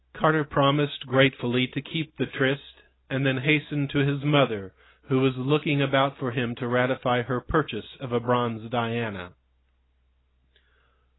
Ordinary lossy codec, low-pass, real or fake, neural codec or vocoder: AAC, 16 kbps; 7.2 kHz; real; none